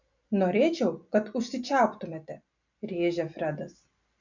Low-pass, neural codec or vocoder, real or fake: 7.2 kHz; none; real